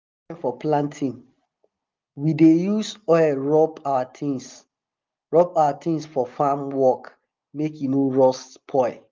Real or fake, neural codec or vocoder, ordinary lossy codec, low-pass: real; none; Opus, 24 kbps; 7.2 kHz